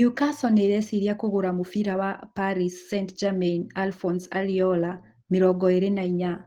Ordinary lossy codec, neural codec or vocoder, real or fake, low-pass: Opus, 16 kbps; none; real; 19.8 kHz